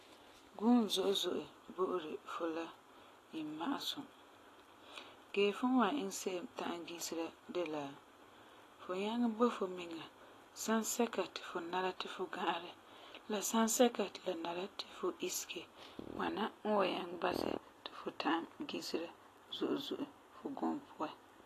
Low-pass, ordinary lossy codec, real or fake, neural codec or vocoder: 14.4 kHz; AAC, 48 kbps; real; none